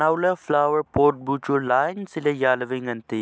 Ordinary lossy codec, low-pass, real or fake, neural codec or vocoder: none; none; real; none